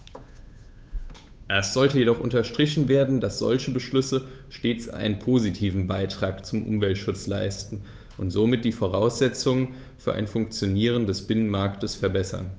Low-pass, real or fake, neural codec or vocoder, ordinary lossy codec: none; fake; codec, 16 kHz, 8 kbps, FunCodec, trained on Chinese and English, 25 frames a second; none